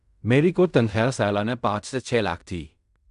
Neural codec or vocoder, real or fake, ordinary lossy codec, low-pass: codec, 16 kHz in and 24 kHz out, 0.4 kbps, LongCat-Audio-Codec, fine tuned four codebook decoder; fake; none; 10.8 kHz